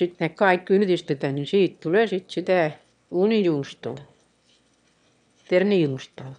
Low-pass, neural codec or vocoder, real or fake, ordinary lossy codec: 9.9 kHz; autoencoder, 22.05 kHz, a latent of 192 numbers a frame, VITS, trained on one speaker; fake; none